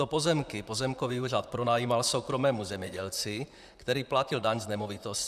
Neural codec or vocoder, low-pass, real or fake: vocoder, 44.1 kHz, 128 mel bands, Pupu-Vocoder; 14.4 kHz; fake